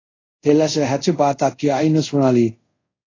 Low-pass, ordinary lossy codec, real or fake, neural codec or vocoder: 7.2 kHz; AAC, 32 kbps; fake; codec, 24 kHz, 0.5 kbps, DualCodec